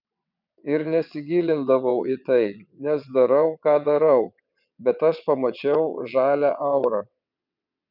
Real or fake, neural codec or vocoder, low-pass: fake; vocoder, 22.05 kHz, 80 mel bands, WaveNeXt; 5.4 kHz